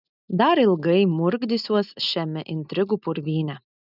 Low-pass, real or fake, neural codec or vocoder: 5.4 kHz; real; none